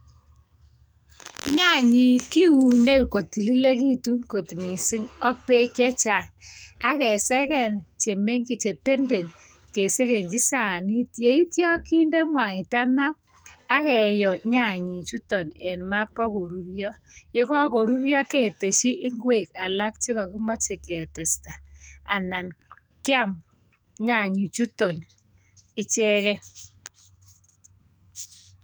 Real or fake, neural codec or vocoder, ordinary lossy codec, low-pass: fake; codec, 44.1 kHz, 2.6 kbps, SNAC; none; none